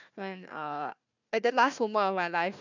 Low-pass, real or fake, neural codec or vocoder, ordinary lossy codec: 7.2 kHz; fake; codec, 16 kHz, 1 kbps, FunCodec, trained on Chinese and English, 50 frames a second; none